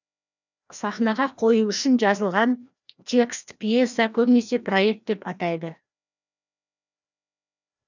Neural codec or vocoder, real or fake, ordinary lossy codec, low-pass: codec, 16 kHz, 1 kbps, FreqCodec, larger model; fake; none; 7.2 kHz